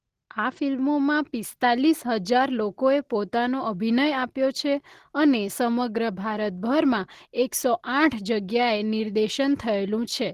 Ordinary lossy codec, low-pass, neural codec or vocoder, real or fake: Opus, 16 kbps; 14.4 kHz; none; real